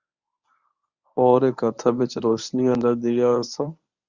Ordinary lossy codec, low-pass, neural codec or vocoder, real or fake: Opus, 64 kbps; 7.2 kHz; codec, 24 kHz, 0.9 kbps, WavTokenizer, medium speech release version 1; fake